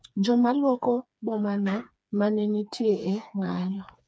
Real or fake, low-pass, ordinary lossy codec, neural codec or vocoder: fake; none; none; codec, 16 kHz, 4 kbps, FreqCodec, smaller model